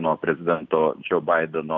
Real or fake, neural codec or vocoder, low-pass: real; none; 7.2 kHz